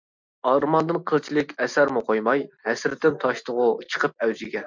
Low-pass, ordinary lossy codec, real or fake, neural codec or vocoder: 7.2 kHz; MP3, 64 kbps; real; none